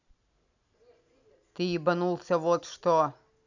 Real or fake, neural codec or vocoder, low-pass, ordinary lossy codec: real; none; 7.2 kHz; none